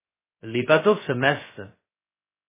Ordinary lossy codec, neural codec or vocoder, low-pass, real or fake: MP3, 16 kbps; codec, 16 kHz, 0.2 kbps, FocalCodec; 3.6 kHz; fake